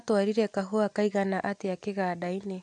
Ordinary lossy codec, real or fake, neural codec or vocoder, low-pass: AAC, 64 kbps; real; none; 10.8 kHz